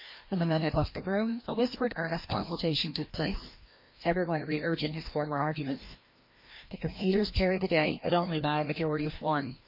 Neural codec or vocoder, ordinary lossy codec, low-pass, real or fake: codec, 16 kHz, 1 kbps, FreqCodec, larger model; MP3, 32 kbps; 5.4 kHz; fake